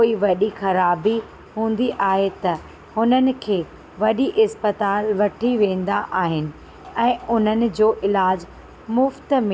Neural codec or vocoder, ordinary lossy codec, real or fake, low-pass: none; none; real; none